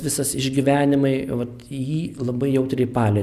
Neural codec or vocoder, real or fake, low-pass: none; real; 14.4 kHz